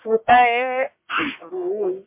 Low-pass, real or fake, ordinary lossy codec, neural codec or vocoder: 3.6 kHz; fake; MP3, 32 kbps; codec, 44.1 kHz, 1.7 kbps, Pupu-Codec